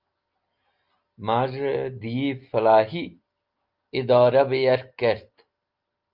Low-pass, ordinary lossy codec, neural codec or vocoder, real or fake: 5.4 kHz; Opus, 32 kbps; none; real